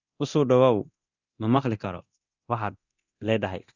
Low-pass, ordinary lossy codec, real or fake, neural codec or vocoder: 7.2 kHz; Opus, 64 kbps; fake; codec, 24 kHz, 0.9 kbps, DualCodec